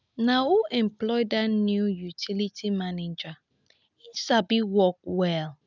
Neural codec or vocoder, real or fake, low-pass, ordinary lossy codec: none; real; 7.2 kHz; none